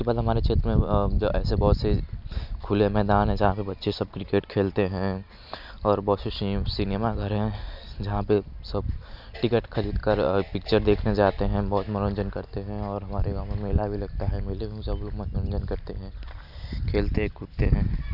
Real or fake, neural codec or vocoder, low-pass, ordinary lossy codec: real; none; 5.4 kHz; none